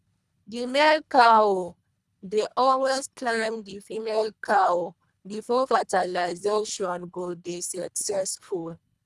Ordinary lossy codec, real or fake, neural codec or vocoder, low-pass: none; fake; codec, 24 kHz, 1.5 kbps, HILCodec; none